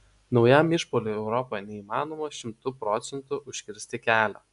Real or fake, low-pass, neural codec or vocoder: real; 10.8 kHz; none